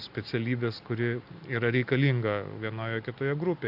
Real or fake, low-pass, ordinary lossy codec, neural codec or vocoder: real; 5.4 kHz; AAC, 48 kbps; none